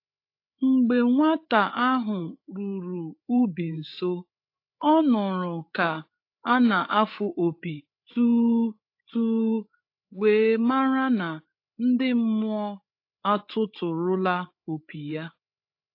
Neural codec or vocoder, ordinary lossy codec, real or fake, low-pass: codec, 16 kHz, 8 kbps, FreqCodec, larger model; AAC, 32 kbps; fake; 5.4 kHz